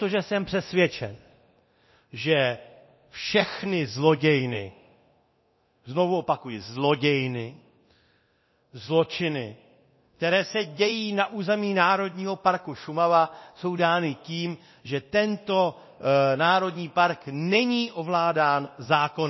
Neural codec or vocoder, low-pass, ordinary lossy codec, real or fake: codec, 24 kHz, 0.9 kbps, DualCodec; 7.2 kHz; MP3, 24 kbps; fake